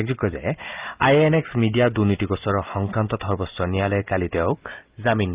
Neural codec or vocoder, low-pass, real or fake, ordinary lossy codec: none; 3.6 kHz; real; Opus, 32 kbps